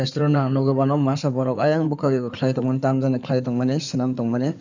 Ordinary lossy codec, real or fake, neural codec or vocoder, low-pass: none; fake; codec, 16 kHz in and 24 kHz out, 2.2 kbps, FireRedTTS-2 codec; 7.2 kHz